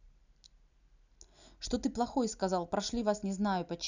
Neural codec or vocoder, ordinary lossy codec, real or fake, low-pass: none; none; real; 7.2 kHz